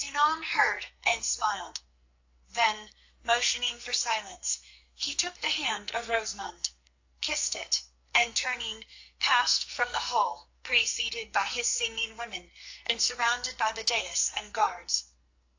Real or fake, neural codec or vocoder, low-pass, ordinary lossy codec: fake; codec, 44.1 kHz, 2.6 kbps, SNAC; 7.2 kHz; AAC, 48 kbps